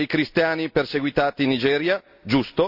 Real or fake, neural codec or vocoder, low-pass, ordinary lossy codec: real; none; 5.4 kHz; none